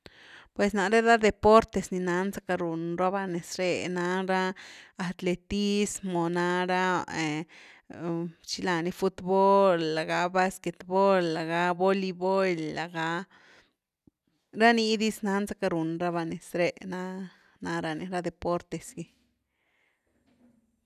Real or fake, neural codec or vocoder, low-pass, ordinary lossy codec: real; none; 14.4 kHz; none